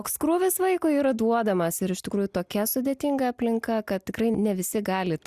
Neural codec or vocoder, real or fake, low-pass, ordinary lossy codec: none; real; 14.4 kHz; Opus, 64 kbps